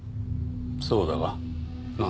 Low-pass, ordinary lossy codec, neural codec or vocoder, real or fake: none; none; none; real